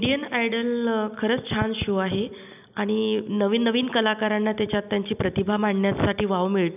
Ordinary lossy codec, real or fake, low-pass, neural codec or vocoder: none; real; 3.6 kHz; none